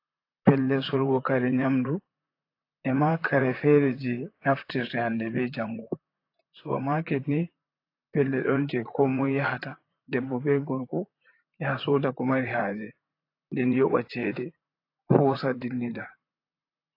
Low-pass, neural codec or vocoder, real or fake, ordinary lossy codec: 5.4 kHz; vocoder, 44.1 kHz, 128 mel bands, Pupu-Vocoder; fake; AAC, 32 kbps